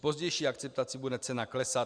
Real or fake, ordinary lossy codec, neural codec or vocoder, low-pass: real; Opus, 64 kbps; none; 9.9 kHz